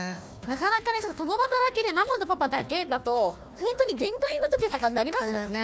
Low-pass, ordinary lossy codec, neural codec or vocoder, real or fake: none; none; codec, 16 kHz, 1 kbps, FunCodec, trained on Chinese and English, 50 frames a second; fake